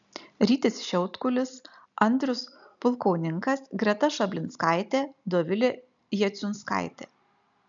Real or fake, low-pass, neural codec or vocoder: real; 7.2 kHz; none